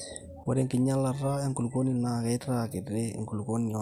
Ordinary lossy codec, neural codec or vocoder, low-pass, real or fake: none; none; 19.8 kHz; real